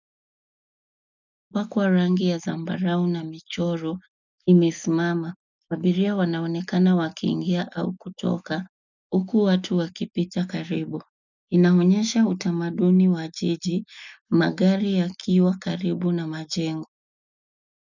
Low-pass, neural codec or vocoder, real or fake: 7.2 kHz; none; real